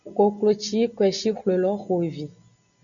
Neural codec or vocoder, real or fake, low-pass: none; real; 7.2 kHz